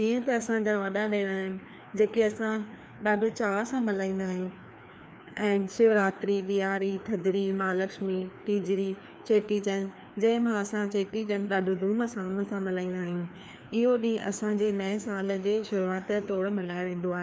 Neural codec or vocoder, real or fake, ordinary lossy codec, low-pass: codec, 16 kHz, 2 kbps, FreqCodec, larger model; fake; none; none